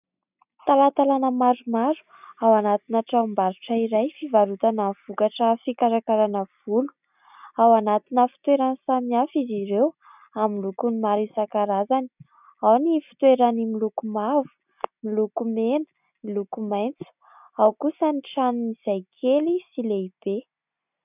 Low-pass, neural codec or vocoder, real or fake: 3.6 kHz; none; real